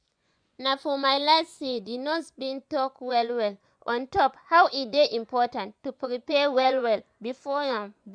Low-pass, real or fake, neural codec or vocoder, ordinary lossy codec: 9.9 kHz; fake; vocoder, 24 kHz, 100 mel bands, Vocos; none